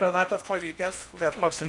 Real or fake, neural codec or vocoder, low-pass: fake; codec, 16 kHz in and 24 kHz out, 0.8 kbps, FocalCodec, streaming, 65536 codes; 10.8 kHz